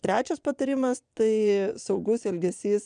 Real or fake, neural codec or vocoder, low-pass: fake; vocoder, 22.05 kHz, 80 mel bands, Vocos; 9.9 kHz